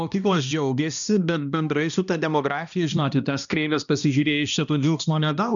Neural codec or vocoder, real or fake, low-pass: codec, 16 kHz, 1 kbps, X-Codec, HuBERT features, trained on balanced general audio; fake; 7.2 kHz